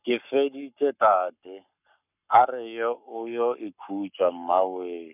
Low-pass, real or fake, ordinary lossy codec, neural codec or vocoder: 3.6 kHz; fake; none; codec, 44.1 kHz, 7.8 kbps, Pupu-Codec